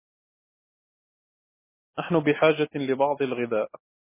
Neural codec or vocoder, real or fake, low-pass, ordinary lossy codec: none; real; 3.6 kHz; MP3, 16 kbps